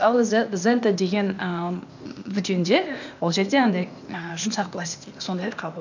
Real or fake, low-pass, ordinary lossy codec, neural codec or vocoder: fake; 7.2 kHz; none; codec, 16 kHz, 0.8 kbps, ZipCodec